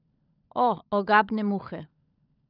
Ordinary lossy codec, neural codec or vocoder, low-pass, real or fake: none; codec, 16 kHz, 16 kbps, FunCodec, trained on LibriTTS, 50 frames a second; 5.4 kHz; fake